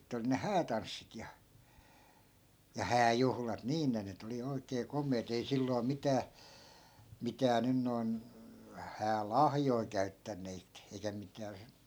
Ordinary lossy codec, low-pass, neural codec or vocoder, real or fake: none; none; none; real